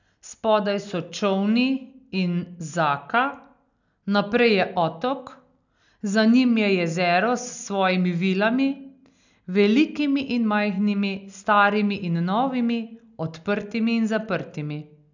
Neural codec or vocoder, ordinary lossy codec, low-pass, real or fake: none; none; 7.2 kHz; real